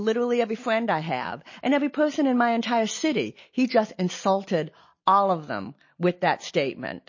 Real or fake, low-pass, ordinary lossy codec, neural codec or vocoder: real; 7.2 kHz; MP3, 32 kbps; none